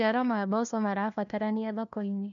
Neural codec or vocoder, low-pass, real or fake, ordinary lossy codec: codec, 16 kHz, 1 kbps, FunCodec, trained on LibriTTS, 50 frames a second; 7.2 kHz; fake; none